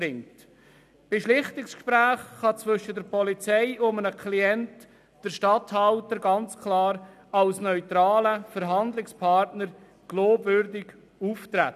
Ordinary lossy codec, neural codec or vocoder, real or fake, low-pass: none; none; real; 14.4 kHz